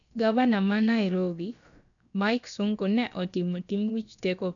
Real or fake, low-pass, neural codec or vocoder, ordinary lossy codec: fake; 7.2 kHz; codec, 16 kHz, about 1 kbps, DyCAST, with the encoder's durations; none